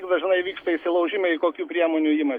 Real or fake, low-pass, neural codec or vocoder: real; 19.8 kHz; none